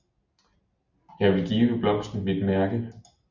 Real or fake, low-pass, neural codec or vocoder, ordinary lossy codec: real; 7.2 kHz; none; Opus, 64 kbps